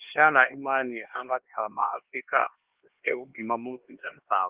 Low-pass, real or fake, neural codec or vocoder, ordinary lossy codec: 3.6 kHz; fake; codec, 16 kHz, 2 kbps, X-Codec, HuBERT features, trained on LibriSpeech; Opus, 16 kbps